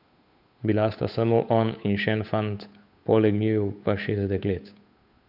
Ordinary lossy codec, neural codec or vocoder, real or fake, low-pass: none; codec, 16 kHz, 8 kbps, FunCodec, trained on Chinese and English, 25 frames a second; fake; 5.4 kHz